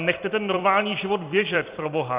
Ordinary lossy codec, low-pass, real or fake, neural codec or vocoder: Opus, 64 kbps; 3.6 kHz; fake; vocoder, 44.1 kHz, 128 mel bands every 256 samples, BigVGAN v2